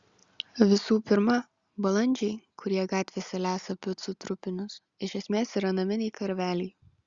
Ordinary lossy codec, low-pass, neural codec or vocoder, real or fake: Opus, 64 kbps; 7.2 kHz; none; real